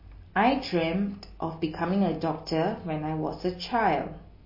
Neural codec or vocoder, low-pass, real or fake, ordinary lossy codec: none; 5.4 kHz; real; MP3, 24 kbps